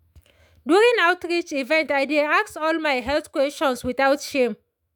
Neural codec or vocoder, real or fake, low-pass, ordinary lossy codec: autoencoder, 48 kHz, 128 numbers a frame, DAC-VAE, trained on Japanese speech; fake; none; none